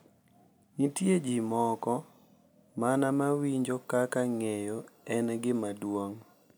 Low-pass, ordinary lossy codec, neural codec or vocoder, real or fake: none; none; none; real